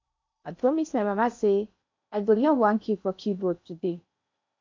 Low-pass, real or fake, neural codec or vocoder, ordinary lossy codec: 7.2 kHz; fake; codec, 16 kHz in and 24 kHz out, 0.8 kbps, FocalCodec, streaming, 65536 codes; MP3, 48 kbps